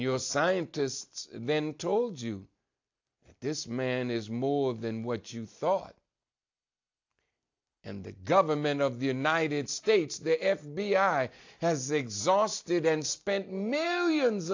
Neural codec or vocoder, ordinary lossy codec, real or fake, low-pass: none; AAC, 48 kbps; real; 7.2 kHz